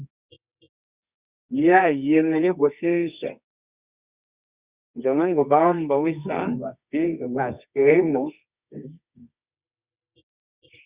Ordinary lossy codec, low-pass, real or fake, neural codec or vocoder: Opus, 64 kbps; 3.6 kHz; fake; codec, 24 kHz, 0.9 kbps, WavTokenizer, medium music audio release